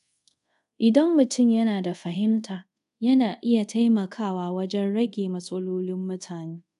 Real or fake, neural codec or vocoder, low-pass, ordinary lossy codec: fake; codec, 24 kHz, 0.5 kbps, DualCodec; 10.8 kHz; none